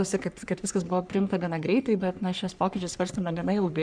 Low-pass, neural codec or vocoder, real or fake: 9.9 kHz; codec, 44.1 kHz, 3.4 kbps, Pupu-Codec; fake